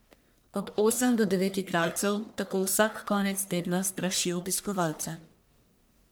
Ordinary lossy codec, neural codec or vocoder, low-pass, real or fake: none; codec, 44.1 kHz, 1.7 kbps, Pupu-Codec; none; fake